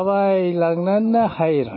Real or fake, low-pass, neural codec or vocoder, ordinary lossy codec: real; 5.4 kHz; none; MP3, 24 kbps